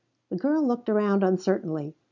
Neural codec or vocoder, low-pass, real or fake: none; 7.2 kHz; real